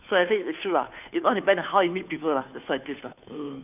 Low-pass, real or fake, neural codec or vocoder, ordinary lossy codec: 3.6 kHz; fake; codec, 16 kHz, 2 kbps, FunCodec, trained on Chinese and English, 25 frames a second; none